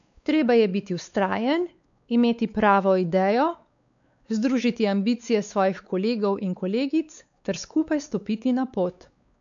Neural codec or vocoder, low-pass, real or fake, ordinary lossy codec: codec, 16 kHz, 4 kbps, X-Codec, WavLM features, trained on Multilingual LibriSpeech; 7.2 kHz; fake; none